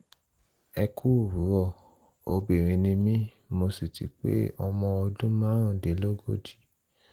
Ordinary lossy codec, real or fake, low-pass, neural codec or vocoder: Opus, 24 kbps; real; 19.8 kHz; none